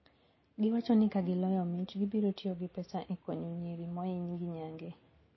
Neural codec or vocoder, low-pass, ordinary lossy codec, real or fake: none; 7.2 kHz; MP3, 24 kbps; real